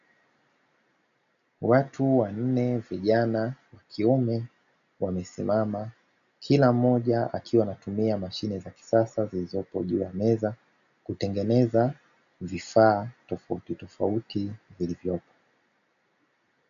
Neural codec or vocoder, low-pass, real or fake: none; 7.2 kHz; real